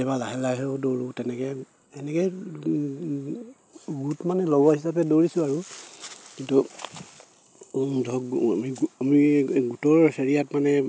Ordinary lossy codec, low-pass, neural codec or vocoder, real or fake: none; none; none; real